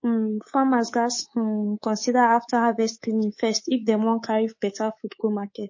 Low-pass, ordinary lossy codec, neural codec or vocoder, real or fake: 7.2 kHz; MP3, 32 kbps; codec, 24 kHz, 3.1 kbps, DualCodec; fake